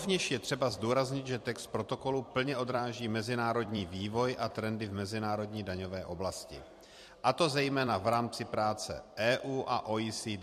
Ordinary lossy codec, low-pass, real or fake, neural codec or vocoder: MP3, 64 kbps; 14.4 kHz; fake; vocoder, 44.1 kHz, 128 mel bands every 256 samples, BigVGAN v2